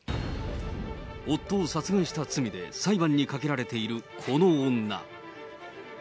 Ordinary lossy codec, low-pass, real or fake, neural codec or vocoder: none; none; real; none